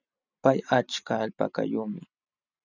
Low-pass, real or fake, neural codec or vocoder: 7.2 kHz; real; none